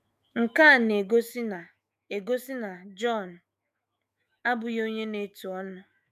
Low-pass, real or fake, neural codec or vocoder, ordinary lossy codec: 14.4 kHz; fake; autoencoder, 48 kHz, 128 numbers a frame, DAC-VAE, trained on Japanese speech; MP3, 96 kbps